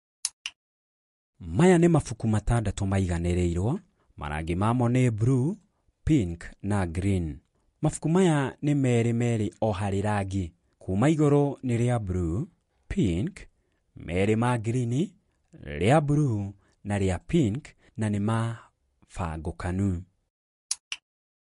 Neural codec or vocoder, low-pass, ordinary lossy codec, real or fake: none; 14.4 kHz; MP3, 48 kbps; real